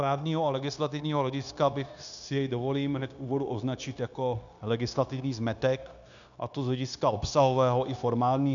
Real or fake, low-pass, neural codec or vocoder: fake; 7.2 kHz; codec, 16 kHz, 0.9 kbps, LongCat-Audio-Codec